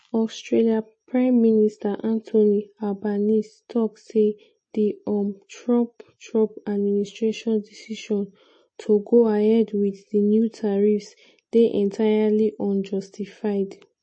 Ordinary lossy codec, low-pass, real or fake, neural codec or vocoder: MP3, 32 kbps; 9.9 kHz; real; none